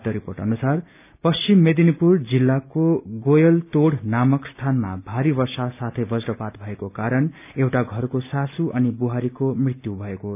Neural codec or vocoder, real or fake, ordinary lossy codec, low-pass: none; real; none; 3.6 kHz